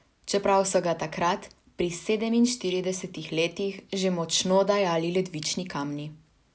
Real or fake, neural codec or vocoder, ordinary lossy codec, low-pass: real; none; none; none